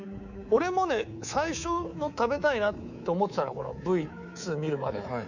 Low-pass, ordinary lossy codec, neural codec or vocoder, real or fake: 7.2 kHz; none; codec, 24 kHz, 3.1 kbps, DualCodec; fake